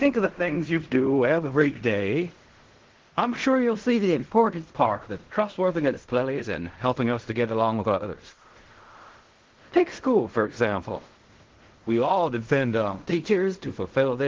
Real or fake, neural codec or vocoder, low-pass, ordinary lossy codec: fake; codec, 16 kHz in and 24 kHz out, 0.4 kbps, LongCat-Audio-Codec, fine tuned four codebook decoder; 7.2 kHz; Opus, 16 kbps